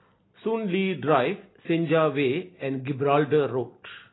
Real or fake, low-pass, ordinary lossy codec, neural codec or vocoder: real; 7.2 kHz; AAC, 16 kbps; none